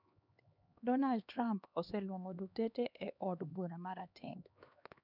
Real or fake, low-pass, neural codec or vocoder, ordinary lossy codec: fake; 5.4 kHz; codec, 16 kHz, 2 kbps, X-Codec, HuBERT features, trained on LibriSpeech; none